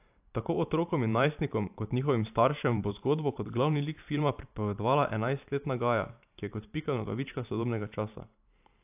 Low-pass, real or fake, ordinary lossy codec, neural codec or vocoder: 3.6 kHz; fake; AAC, 32 kbps; vocoder, 44.1 kHz, 128 mel bands every 512 samples, BigVGAN v2